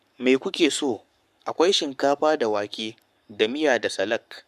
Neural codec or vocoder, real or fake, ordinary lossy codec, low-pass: codec, 44.1 kHz, 7.8 kbps, Pupu-Codec; fake; none; 14.4 kHz